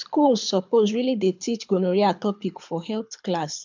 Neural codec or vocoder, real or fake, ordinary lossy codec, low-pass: codec, 24 kHz, 6 kbps, HILCodec; fake; MP3, 64 kbps; 7.2 kHz